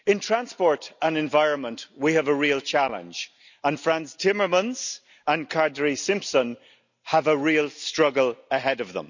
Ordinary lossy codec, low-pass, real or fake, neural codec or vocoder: none; 7.2 kHz; real; none